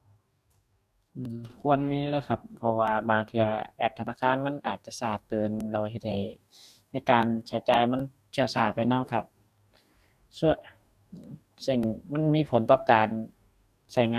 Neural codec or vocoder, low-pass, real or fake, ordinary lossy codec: codec, 44.1 kHz, 2.6 kbps, DAC; 14.4 kHz; fake; none